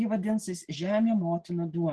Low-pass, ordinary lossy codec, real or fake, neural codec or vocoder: 10.8 kHz; Opus, 16 kbps; real; none